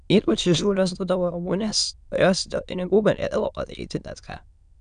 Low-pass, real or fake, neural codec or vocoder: 9.9 kHz; fake; autoencoder, 22.05 kHz, a latent of 192 numbers a frame, VITS, trained on many speakers